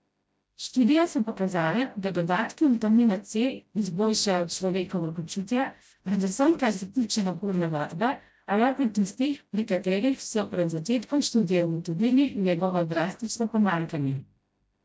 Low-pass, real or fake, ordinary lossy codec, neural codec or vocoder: none; fake; none; codec, 16 kHz, 0.5 kbps, FreqCodec, smaller model